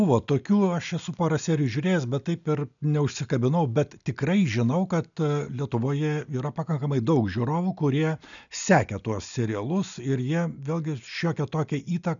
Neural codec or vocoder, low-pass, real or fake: none; 7.2 kHz; real